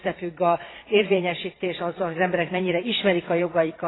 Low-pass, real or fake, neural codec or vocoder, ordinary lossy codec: 7.2 kHz; fake; vocoder, 22.05 kHz, 80 mel bands, Vocos; AAC, 16 kbps